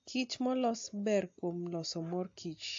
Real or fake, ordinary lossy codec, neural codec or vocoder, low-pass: real; AAC, 48 kbps; none; 7.2 kHz